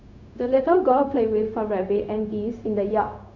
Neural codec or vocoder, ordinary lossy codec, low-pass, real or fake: codec, 16 kHz, 0.4 kbps, LongCat-Audio-Codec; none; 7.2 kHz; fake